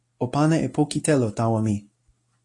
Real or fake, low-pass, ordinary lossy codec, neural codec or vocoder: real; 10.8 kHz; AAC, 48 kbps; none